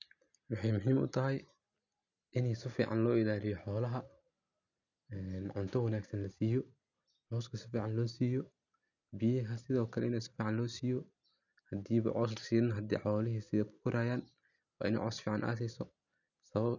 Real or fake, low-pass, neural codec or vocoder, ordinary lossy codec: real; 7.2 kHz; none; none